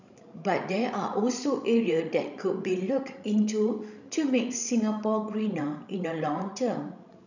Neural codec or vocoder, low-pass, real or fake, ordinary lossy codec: codec, 16 kHz, 16 kbps, FreqCodec, larger model; 7.2 kHz; fake; none